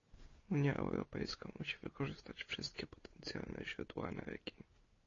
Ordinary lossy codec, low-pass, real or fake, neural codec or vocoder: AAC, 32 kbps; 7.2 kHz; real; none